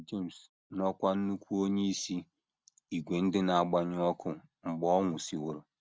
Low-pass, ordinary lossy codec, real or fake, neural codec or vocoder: none; none; real; none